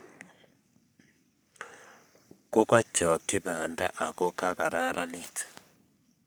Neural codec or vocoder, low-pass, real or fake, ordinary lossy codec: codec, 44.1 kHz, 3.4 kbps, Pupu-Codec; none; fake; none